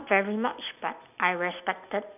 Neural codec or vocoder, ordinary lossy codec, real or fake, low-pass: none; none; real; 3.6 kHz